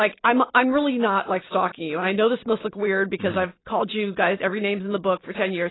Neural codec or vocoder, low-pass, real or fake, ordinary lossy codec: none; 7.2 kHz; real; AAC, 16 kbps